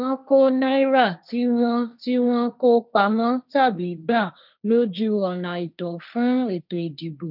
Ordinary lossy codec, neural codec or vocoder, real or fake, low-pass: none; codec, 16 kHz, 1.1 kbps, Voila-Tokenizer; fake; 5.4 kHz